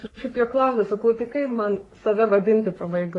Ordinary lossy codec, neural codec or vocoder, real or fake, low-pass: AAC, 32 kbps; codec, 44.1 kHz, 3.4 kbps, Pupu-Codec; fake; 10.8 kHz